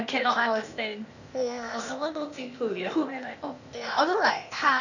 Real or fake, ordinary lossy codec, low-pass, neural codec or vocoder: fake; none; 7.2 kHz; codec, 16 kHz, 0.8 kbps, ZipCodec